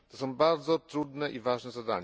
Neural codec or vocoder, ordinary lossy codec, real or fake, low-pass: none; none; real; none